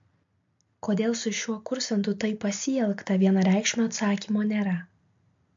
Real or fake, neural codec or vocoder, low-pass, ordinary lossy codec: real; none; 7.2 kHz; MP3, 48 kbps